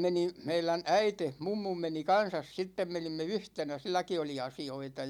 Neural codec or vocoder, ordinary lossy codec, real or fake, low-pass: none; none; real; 19.8 kHz